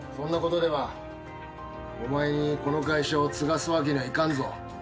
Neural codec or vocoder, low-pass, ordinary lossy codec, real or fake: none; none; none; real